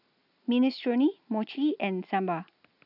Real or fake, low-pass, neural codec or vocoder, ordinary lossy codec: real; 5.4 kHz; none; none